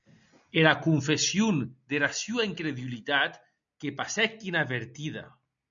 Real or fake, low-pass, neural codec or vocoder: real; 7.2 kHz; none